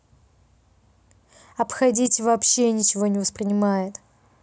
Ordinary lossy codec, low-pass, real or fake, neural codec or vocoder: none; none; real; none